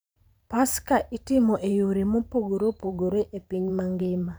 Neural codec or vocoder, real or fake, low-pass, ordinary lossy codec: vocoder, 44.1 kHz, 128 mel bands every 512 samples, BigVGAN v2; fake; none; none